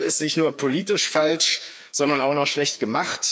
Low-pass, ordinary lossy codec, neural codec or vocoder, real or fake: none; none; codec, 16 kHz, 2 kbps, FreqCodec, larger model; fake